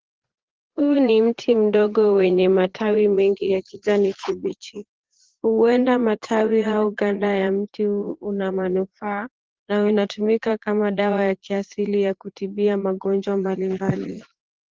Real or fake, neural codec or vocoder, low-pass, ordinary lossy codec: fake; vocoder, 22.05 kHz, 80 mel bands, Vocos; 7.2 kHz; Opus, 16 kbps